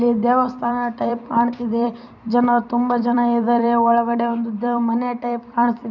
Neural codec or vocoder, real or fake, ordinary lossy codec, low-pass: vocoder, 44.1 kHz, 128 mel bands every 256 samples, BigVGAN v2; fake; none; 7.2 kHz